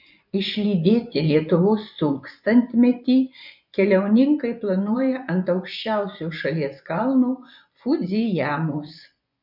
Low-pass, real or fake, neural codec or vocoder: 5.4 kHz; fake; vocoder, 24 kHz, 100 mel bands, Vocos